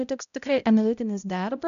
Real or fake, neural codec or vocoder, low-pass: fake; codec, 16 kHz, 0.5 kbps, X-Codec, HuBERT features, trained on balanced general audio; 7.2 kHz